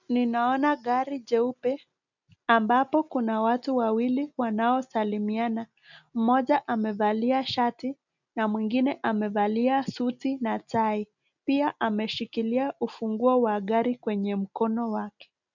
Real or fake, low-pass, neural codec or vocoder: real; 7.2 kHz; none